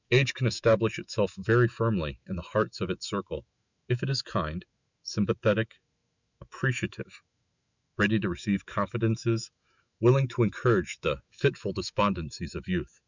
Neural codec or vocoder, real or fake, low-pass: autoencoder, 48 kHz, 128 numbers a frame, DAC-VAE, trained on Japanese speech; fake; 7.2 kHz